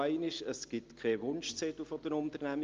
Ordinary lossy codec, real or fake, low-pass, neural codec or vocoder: Opus, 24 kbps; real; 7.2 kHz; none